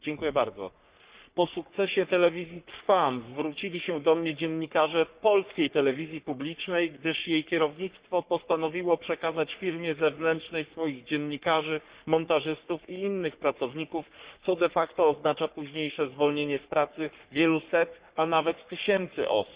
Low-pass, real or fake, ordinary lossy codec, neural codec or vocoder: 3.6 kHz; fake; Opus, 64 kbps; codec, 44.1 kHz, 3.4 kbps, Pupu-Codec